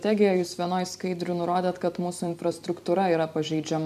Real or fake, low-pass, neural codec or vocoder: fake; 14.4 kHz; vocoder, 44.1 kHz, 128 mel bands every 256 samples, BigVGAN v2